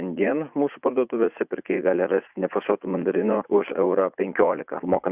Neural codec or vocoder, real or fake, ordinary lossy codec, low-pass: vocoder, 44.1 kHz, 80 mel bands, Vocos; fake; Opus, 64 kbps; 3.6 kHz